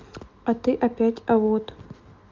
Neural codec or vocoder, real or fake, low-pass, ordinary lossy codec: none; real; 7.2 kHz; Opus, 24 kbps